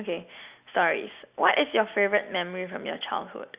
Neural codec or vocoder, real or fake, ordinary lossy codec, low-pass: none; real; Opus, 64 kbps; 3.6 kHz